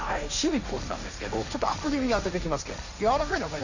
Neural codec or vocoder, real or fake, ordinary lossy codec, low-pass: codec, 16 kHz, 1.1 kbps, Voila-Tokenizer; fake; none; 7.2 kHz